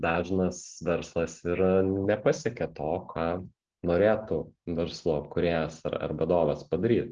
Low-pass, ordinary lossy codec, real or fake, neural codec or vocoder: 7.2 kHz; Opus, 16 kbps; real; none